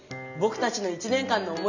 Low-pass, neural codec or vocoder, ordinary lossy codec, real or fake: 7.2 kHz; none; none; real